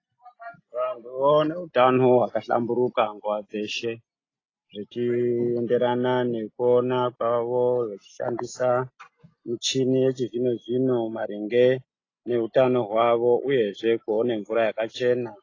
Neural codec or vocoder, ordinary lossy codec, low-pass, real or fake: none; AAC, 32 kbps; 7.2 kHz; real